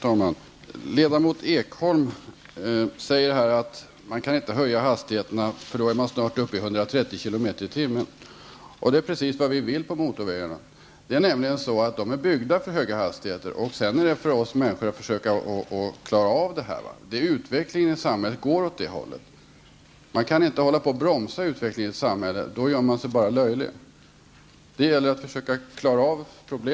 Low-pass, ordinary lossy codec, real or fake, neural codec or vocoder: none; none; real; none